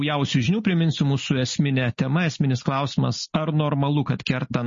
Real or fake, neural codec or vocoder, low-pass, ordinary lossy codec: real; none; 7.2 kHz; MP3, 32 kbps